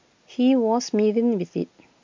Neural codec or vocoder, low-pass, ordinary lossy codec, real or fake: none; 7.2 kHz; MP3, 48 kbps; real